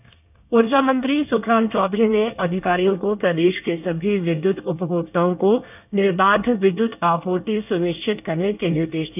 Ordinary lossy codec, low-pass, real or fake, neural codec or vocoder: none; 3.6 kHz; fake; codec, 24 kHz, 1 kbps, SNAC